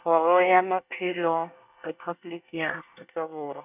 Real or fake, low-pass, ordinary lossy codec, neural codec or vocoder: fake; 3.6 kHz; none; codec, 24 kHz, 1 kbps, SNAC